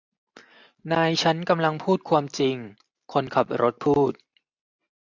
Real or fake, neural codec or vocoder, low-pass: real; none; 7.2 kHz